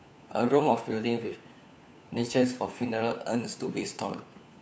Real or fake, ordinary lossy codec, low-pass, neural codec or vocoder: fake; none; none; codec, 16 kHz, 4 kbps, FunCodec, trained on LibriTTS, 50 frames a second